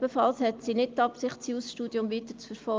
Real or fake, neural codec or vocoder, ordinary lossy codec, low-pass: real; none; Opus, 24 kbps; 7.2 kHz